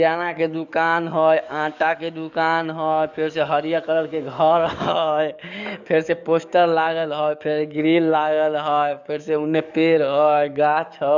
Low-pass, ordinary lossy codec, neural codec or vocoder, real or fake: 7.2 kHz; none; codec, 44.1 kHz, 7.8 kbps, DAC; fake